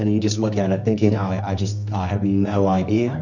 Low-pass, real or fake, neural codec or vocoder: 7.2 kHz; fake; codec, 24 kHz, 0.9 kbps, WavTokenizer, medium music audio release